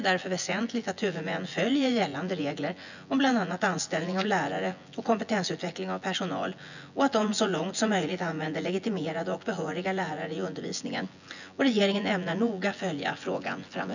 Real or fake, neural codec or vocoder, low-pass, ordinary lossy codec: fake; vocoder, 24 kHz, 100 mel bands, Vocos; 7.2 kHz; none